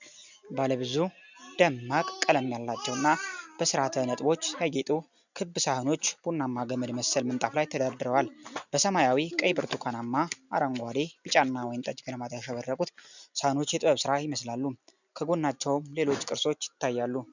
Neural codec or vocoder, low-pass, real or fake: none; 7.2 kHz; real